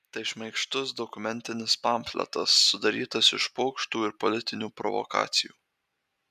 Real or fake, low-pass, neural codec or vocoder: real; 14.4 kHz; none